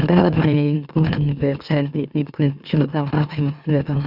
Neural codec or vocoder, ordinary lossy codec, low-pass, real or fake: autoencoder, 44.1 kHz, a latent of 192 numbers a frame, MeloTTS; none; 5.4 kHz; fake